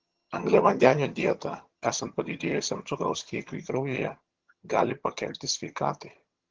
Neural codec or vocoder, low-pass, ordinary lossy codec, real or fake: vocoder, 22.05 kHz, 80 mel bands, HiFi-GAN; 7.2 kHz; Opus, 16 kbps; fake